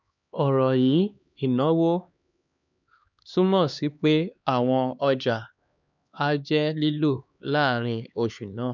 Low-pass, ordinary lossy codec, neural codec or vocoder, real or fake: 7.2 kHz; none; codec, 16 kHz, 2 kbps, X-Codec, HuBERT features, trained on LibriSpeech; fake